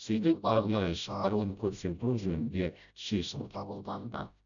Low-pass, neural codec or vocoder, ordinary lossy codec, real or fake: 7.2 kHz; codec, 16 kHz, 0.5 kbps, FreqCodec, smaller model; none; fake